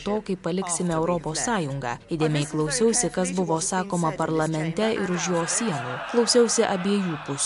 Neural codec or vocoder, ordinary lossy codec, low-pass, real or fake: none; MP3, 64 kbps; 10.8 kHz; real